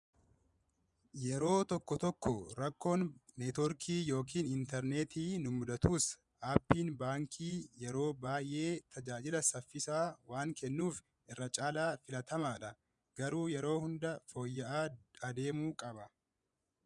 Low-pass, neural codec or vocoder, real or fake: 10.8 kHz; vocoder, 24 kHz, 100 mel bands, Vocos; fake